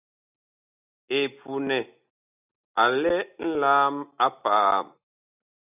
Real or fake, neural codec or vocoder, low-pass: real; none; 3.6 kHz